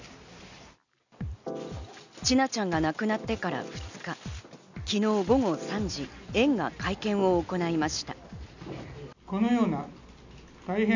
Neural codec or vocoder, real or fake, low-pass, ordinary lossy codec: none; real; 7.2 kHz; none